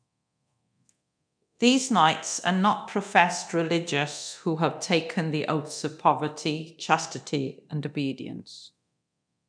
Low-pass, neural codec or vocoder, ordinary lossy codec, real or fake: 9.9 kHz; codec, 24 kHz, 0.9 kbps, DualCodec; none; fake